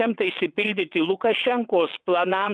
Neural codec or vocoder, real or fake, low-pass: vocoder, 22.05 kHz, 80 mel bands, WaveNeXt; fake; 9.9 kHz